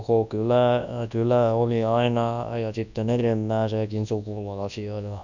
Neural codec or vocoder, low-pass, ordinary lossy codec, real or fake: codec, 24 kHz, 0.9 kbps, WavTokenizer, large speech release; 7.2 kHz; none; fake